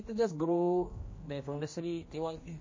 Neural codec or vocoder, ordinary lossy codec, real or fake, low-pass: codec, 16 kHz, 1 kbps, X-Codec, HuBERT features, trained on general audio; MP3, 32 kbps; fake; 7.2 kHz